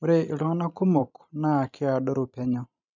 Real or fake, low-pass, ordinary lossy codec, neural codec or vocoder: fake; 7.2 kHz; none; vocoder, 44.1 kHz, 128 mel bands every 512 samples, BigVGAN v2